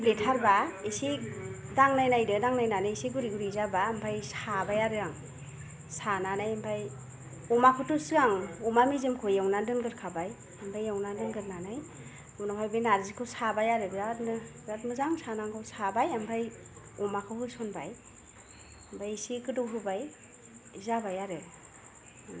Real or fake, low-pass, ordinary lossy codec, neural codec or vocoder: real; none; none; none